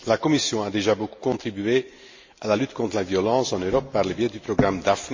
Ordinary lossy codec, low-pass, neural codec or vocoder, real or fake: MP3, 32 kbps; 7.2 kHz; none; real